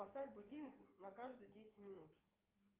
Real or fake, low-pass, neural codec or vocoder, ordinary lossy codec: fake; 3.6 kHz; codec, 16 kHz in and 24 kHz out, 2.2 kbps, FireRedTTS-2 codec; Opus, 32 kbps